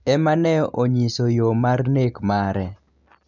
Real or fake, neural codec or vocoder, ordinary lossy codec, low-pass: real; none; none; 7.2 kHz